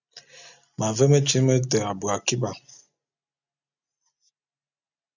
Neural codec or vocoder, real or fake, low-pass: none; real; 7.2 kHz